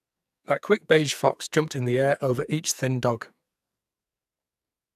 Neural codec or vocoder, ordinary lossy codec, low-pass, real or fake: codec, 44.1 kHz, 2.6 kbps, SNAC; none; 14.4 kHz; fake